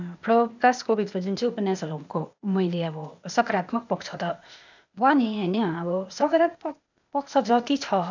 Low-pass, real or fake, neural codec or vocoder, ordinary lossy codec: 7.2 kHz; fake; codec, 16 kHz, 0.8 kbps, ZipCodec; none